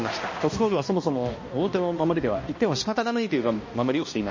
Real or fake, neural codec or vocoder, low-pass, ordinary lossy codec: fake; codec, 16 kHz, 1 kbps, X-Codec, HuBERT features, trained on balanced general audio; 7.2 kHz; MP3, 32 kbps